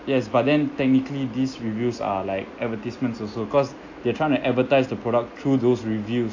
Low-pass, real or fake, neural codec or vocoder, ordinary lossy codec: 7.2 kHz; real; none; AAC, 48 kbps